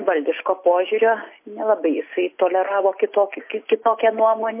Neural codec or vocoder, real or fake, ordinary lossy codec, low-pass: none; real; MP3, 24 kbps; 3.6 kHz